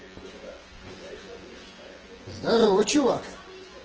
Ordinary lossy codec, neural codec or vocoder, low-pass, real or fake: Opus, 16 kbps; vocoder, 24 kHz, 100 mel bands, Vocos; 7.2 kHz; fake